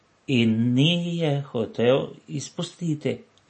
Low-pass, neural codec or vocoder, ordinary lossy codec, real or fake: 10.8 kHz; none; MP3, 32 kbps; real